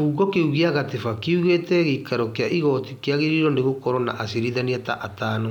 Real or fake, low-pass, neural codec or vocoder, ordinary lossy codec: real; 19.8 kHz; none; none